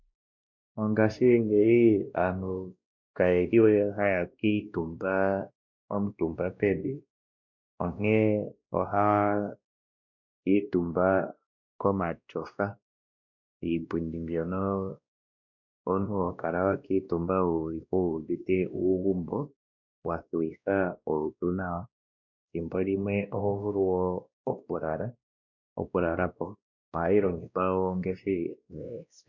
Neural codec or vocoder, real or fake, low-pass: codec, 16 kHz, 1 kbps, X-Codec, WavLM features, trained on Multilingual LibriSpeech; fake; 7.2 kHz